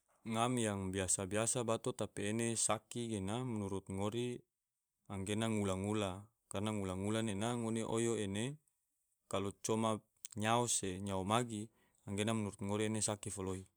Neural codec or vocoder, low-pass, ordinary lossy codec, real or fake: vocoder, 44.1 kHz, 128 mel bands every 512 samples, BigVGAN v2; none; none; fake